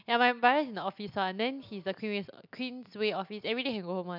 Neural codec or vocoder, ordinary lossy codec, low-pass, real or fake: none; none; 5.4 kHz; real